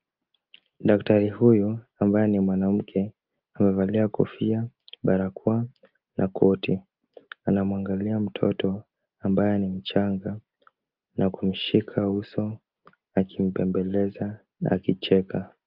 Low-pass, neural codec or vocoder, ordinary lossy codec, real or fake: 5.4 kHz; none; Opus, 32 kbps; real